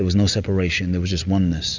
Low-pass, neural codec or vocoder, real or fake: 7.2 kHz; none; real